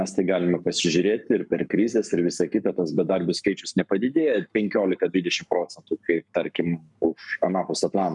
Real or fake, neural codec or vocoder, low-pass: fake; codec, 44.1 kHz, 7.8 kbps, Pupu-Codec; 10.8 kHz